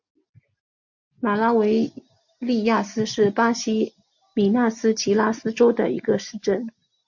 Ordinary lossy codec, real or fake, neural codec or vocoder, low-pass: MP3, 48 kbps; real; none; 7.2 kHz